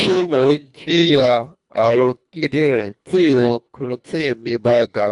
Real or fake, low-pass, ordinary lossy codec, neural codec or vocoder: fake; 10.8 kHz; none; codec, 24 kHz, 1.5 kbps, HILCodec